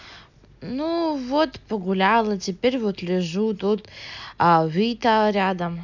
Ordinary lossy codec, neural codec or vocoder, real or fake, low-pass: none; none; real; 7.2 kHz